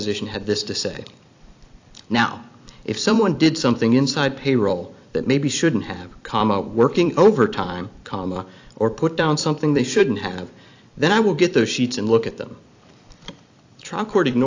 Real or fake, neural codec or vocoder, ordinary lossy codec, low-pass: fake; vocoder, 44.1 kHz, 128 mel bands every 256 samples, BigVGAN v2; AAC, 48 kbps; 7.2 kHz